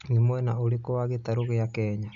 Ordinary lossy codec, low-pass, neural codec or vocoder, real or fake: none; 7.2 kHz; none; real